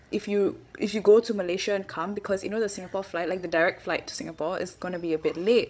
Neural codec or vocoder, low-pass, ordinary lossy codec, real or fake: codec, 16 kHz, 16 kbps, FreqCodec, larger model; none; none; fake